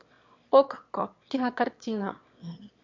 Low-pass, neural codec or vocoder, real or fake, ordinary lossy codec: 7.2 kHz; autoencoder, 22.05 kHz, a latent of 192 numbers a frame, VITS, trained on one speaker; fake; MP3, 48 kbps